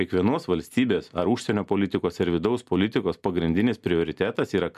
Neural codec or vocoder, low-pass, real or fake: none; 14.4 kHz; real